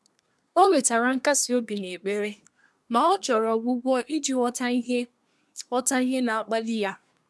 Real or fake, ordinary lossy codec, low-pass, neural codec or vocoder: fake; none; none; codec, 24 kHz, 1 kbps, SNAC